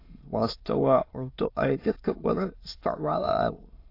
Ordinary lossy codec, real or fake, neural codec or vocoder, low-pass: AAC, 32 kbps; fake; autoencoder, 22.05 kHz, a latent of 192 numbers a frame, VITS, trained on many speakers; 5.4 kHz